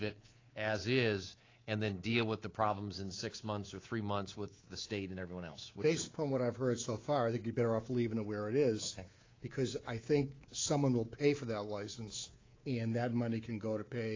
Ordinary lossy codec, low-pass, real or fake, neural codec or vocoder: AAC, 32 kbps; 7.2 kHz; fake; codec, 24 kHz, 3.1 kbps, DualCodec